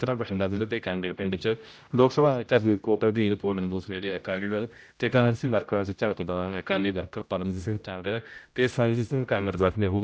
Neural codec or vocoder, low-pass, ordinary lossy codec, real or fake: codec, 16 kHz, 0.5 kbps, X-Codec, HuBERT features, trained on general audio; none; none; fake